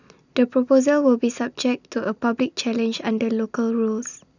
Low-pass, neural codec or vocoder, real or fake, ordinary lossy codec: 7.2 kHz; none; real; none